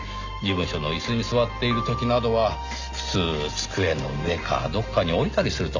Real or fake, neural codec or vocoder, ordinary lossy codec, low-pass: real; none; Opus, 64 kbps; 7.2 kHz